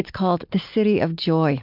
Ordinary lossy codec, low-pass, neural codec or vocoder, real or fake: MP3, 48 kbps; 5.4 kHz; none; real